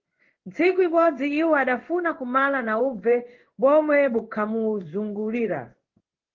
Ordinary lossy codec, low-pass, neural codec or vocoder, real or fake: Opus, 16 kbps; 7.2 kHz; codec, 16 kHz in and 24 kHz out, 1 kbps, XY-Tokenizer; fake